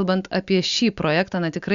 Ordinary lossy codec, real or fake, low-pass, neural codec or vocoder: Opus, 64 kbps; real; 7.2 kHz; none